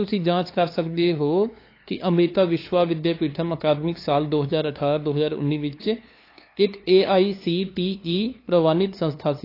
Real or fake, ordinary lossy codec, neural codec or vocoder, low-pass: fake; AAC, 32 kbps; codec, 16 kHz, 2 kbps, FunCodec, trained on LibriTTS, 25 frames a second; 5.4 kHz